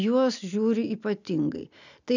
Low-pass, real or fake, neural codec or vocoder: 7.2 kHz; real; none